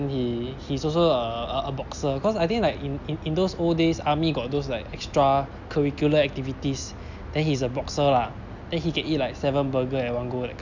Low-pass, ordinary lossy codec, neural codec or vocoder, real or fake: 7.2 kHz; none; none; real